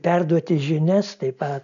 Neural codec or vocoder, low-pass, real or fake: none; 7.2 kHz; real